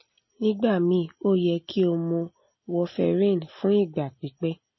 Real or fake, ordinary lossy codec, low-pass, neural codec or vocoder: real; MP3, 24 kbps; 7.2 kHz; none